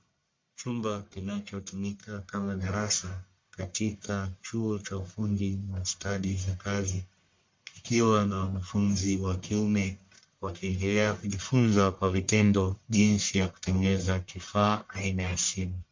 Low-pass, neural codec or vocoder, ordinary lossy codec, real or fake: 7.2 kHz; codec, 44.1 kHz, 1.7 kbps, Pupu-Codec; MP3, 48 kbps; fake